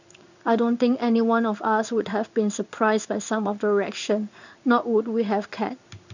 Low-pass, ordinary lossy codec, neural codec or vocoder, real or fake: 7.2 kHz; none; codec, 16 kHz in and 24 kHz out, 1 kbps, XY-Tokenizer; fake